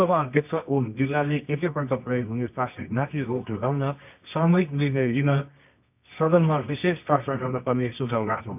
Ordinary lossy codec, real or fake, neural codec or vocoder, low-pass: none; fake; codec, 24 kHz, 0.9 kbps, WavTokenizer, medium music audio release; 3.6 kHz